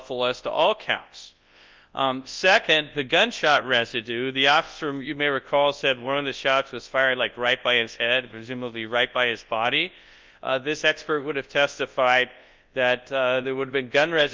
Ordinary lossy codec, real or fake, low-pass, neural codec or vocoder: Opus, 32 kbps; fake; 7.2 kHz; codec, 24 kHz, 0.9 kbps, WavTokenizer, large speech release